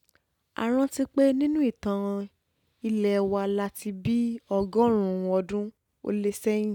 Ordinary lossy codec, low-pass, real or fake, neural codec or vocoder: none; 19.8 kHz; real; none